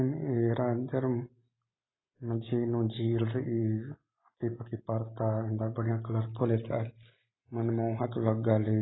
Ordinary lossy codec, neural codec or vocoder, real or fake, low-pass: AAC, 16 kbps; none; real; 7.2 kHz